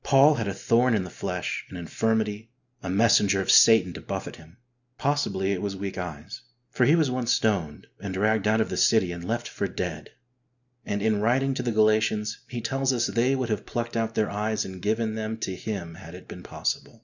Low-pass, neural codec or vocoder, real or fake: 7.2 kHz; none; real